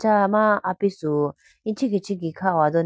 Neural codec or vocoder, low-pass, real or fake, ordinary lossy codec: none; none; real; none